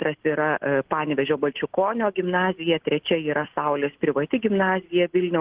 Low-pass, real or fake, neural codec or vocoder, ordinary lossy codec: 3.6 kHz; real; none; Opus, 16 kbps